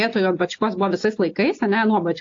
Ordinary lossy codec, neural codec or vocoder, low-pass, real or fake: AAC, 48 kbps; none; 7.2 kHz; real